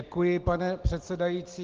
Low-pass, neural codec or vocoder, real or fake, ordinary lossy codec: 7.2 kHz; none; real; Opus, 16 kbps